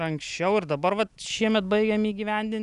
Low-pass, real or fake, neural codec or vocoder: 10.8 kHz; real; none